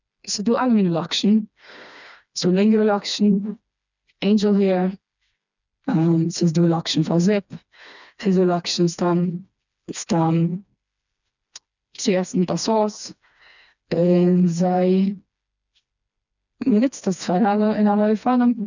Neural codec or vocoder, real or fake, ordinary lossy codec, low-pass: codec, 16 kHz, 2 kbps, FreqCodec, smaller model; fake; none; 7.2 kHz